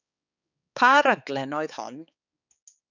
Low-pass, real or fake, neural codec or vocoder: 7.2 kHz; fake; codec, 16 kHz, 4 kbps, X-Codec, HuBERT features, trained on balanced general audio